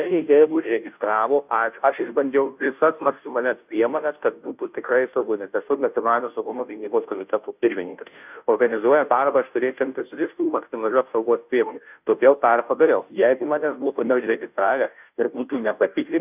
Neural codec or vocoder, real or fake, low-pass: codec, 16 kHz, 0.5 kbps, FunCodec, trained on Chinese and English, 25 frames a second; fake; 3.6 kHz